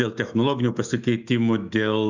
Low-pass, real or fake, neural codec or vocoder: 7.2 kHz; fake; autoencoder, 48 kHz, 128 numbers a frame, DAC-VAE, trained on Japanese speech